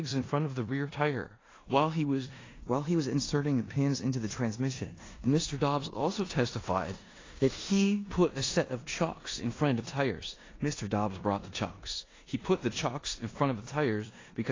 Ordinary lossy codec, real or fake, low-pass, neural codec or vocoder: AAC, 32 kbps; fake; 7.2 kHz; codec, 16 kHz in and 24 kHz out, 0.9 kbps, LongCat-Audio-Codec, four codebook decoder